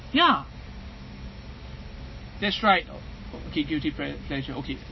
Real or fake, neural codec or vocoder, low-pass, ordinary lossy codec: real; none; 7.2 kHz; MP3, 24 kbps